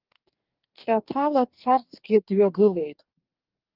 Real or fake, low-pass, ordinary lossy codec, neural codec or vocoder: fake; 5.4 kHz; Opus, 32 kbps; codec, 44.1 kHz, 2.6 kbps, DAC